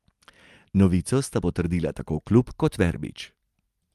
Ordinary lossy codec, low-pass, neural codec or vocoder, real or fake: Opus, 32 kbps; 14.4 kHz; none; real